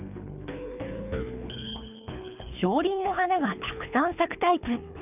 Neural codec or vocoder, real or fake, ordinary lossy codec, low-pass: codec, 24 kHz, 3 kbps, HILCodec; fake; none; 3.6 kHz